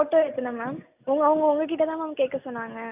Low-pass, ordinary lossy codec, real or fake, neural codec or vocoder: 3.6 kHz; none; real; none